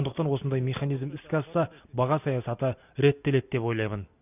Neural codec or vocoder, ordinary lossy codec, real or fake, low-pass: none; none; real; 3.6 kHz